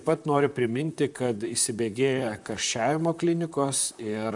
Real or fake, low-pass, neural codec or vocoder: fake; 10.8 kHz; vocoder, 44.1 kHz, 128 mel bands, Pupu-Vocoder